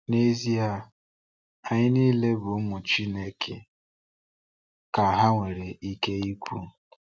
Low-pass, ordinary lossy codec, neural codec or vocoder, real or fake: none; none; none; real